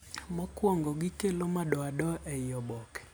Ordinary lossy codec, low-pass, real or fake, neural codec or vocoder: none; none; real; none